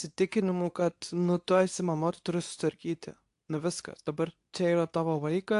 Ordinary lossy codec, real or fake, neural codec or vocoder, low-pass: AAC, 64 kbps; fake; codec, 24 kHz, 0.9 kbps, WavTokenizer, medium speech release version 2; 10.8 kHz